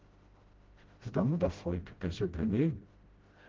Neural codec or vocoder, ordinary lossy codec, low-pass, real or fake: codec, 16 kHz, 0.5 kbps, FreqCodec, smaller model; Opus, 16 kbps; 7.2 kHz; fake